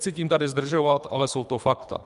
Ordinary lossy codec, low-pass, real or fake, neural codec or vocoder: MP3, 96 kbps; 10.8 kHz; fake; codec, 24 kHz, 3 kbps, HILCodec